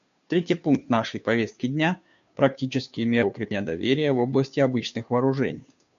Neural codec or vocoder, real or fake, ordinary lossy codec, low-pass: codec, 16 kHz, 2 kbps, FunCodec, trained on Chinese and English, 25 frames a second; fake; MP3, 64 kbps; 7.2 kHz